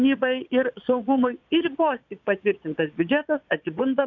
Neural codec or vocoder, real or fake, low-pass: vocoder, 22.05 kHz, 80 mel bands, Vocos; fake; 7.2 kHz